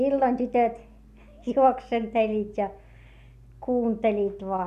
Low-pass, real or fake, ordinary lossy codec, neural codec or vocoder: 14.4 kHz; real; none; none